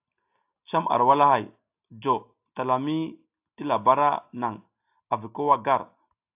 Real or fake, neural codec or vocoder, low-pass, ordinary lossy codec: real; none; 3.6 kHz; AAC, 32 kbps